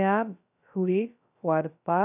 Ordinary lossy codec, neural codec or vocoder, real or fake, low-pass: none; codec, 16 kHz, 0.2 kbps, FocalCodec; fake; 3.6 kHz